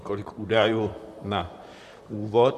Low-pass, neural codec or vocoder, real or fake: 14.4 kHz; vocoder, 44.1 kHz, 128 mel bands, Pupu-Vocoder; fake